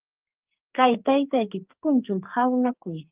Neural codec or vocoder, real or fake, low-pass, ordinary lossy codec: codec, 44.1 kHz, 2.6 kbps, SNAC; fake; 3.6 kHz; Opus, 32 kbps